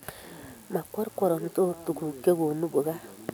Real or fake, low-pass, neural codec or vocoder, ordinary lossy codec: real; none; none; none